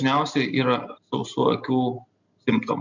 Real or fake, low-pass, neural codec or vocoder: real; 7.2 kHz; none